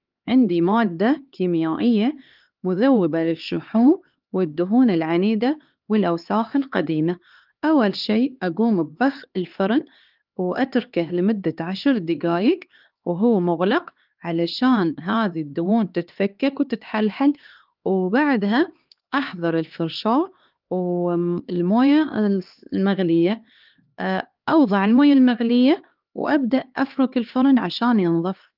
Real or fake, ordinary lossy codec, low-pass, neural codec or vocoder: fake; Opus, 32 kbps; 5.4 kHz; codec, 16 kHz, 2 kbps, X-Codec, HuBERT features, trained on LibriSpeech